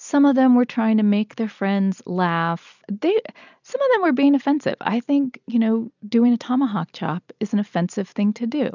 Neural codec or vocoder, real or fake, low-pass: none; real; 7.2 kHz